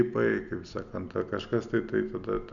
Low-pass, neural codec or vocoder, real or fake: 7.2 kHz; none; real